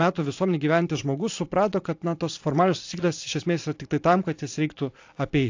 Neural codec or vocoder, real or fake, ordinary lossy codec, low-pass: vocoder, 44.1 kHz, 128 mel bands every 512 samples, BigVGAN v2; fake; AAC, 48 kbps; 7.2 kHz